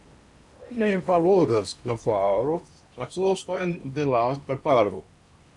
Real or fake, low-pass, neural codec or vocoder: fake; 10.8 kHz; codec, 16 kHz in and 24 kHz out, 0.8 kbps, FocalCodec, streaming, 65536 codes